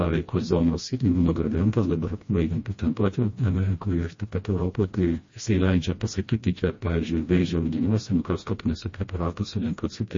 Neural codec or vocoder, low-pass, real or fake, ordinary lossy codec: codec, 16 kHz, 1 kbps, FreqCodec, smaller model; 7.2 kHz; fake; MP3, 32 kbps